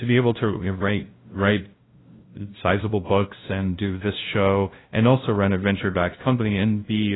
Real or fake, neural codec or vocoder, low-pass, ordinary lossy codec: fake; codec, 16 kHz, 0.5 kbps, FunCodec, trained on LibriTTS, 25 frames a second; 7.2 kHz; AAC, 16 kbps